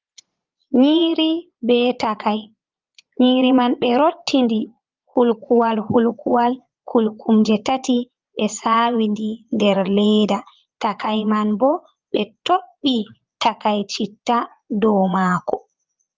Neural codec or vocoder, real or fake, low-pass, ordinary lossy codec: vocoder, 22.05 kHz, 80 mel bands, Vocos; fake; 7.2 kHz; Opus, 32 kbps